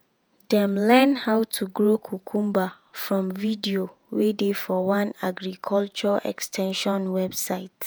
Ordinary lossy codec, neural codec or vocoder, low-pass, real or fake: none; vocoder, 48 kHz, 128 mel bands, Vocos; none; fake